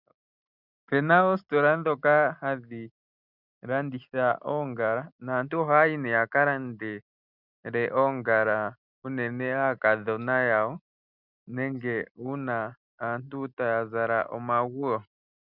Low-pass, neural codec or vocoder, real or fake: 5.4 kHz; none; real